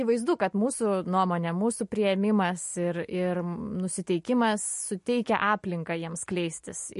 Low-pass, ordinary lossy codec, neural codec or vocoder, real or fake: 14.4 kHz; MP3, 48 kbps; none; real